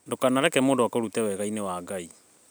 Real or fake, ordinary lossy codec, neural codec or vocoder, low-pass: real; none; none; none